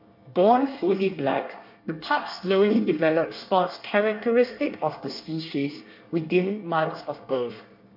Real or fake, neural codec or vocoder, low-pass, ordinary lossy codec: fake; codec, 24 kHz, 1 kbps, SNAC; 5.4 kHz; MP3, 32 kbps